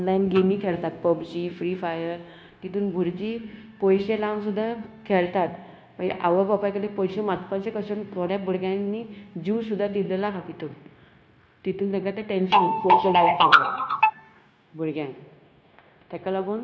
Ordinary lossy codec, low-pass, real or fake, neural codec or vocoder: none; none; fake; codec, 16 kHz, 0.9 kbps, LongCat-Audio-Codec